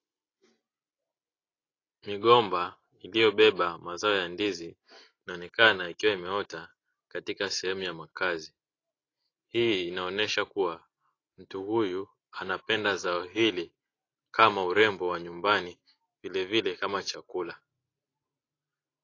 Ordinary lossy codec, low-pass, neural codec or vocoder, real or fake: AAC, 32 kbps; 7.2 kHz; none; real